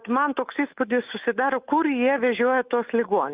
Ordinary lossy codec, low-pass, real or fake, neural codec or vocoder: Opus, 32 kbps; 3.6 kHz; fake; autoencoder, 48 kHz, 128 numbers a frame, DAC-VAE, trained on Japanese speech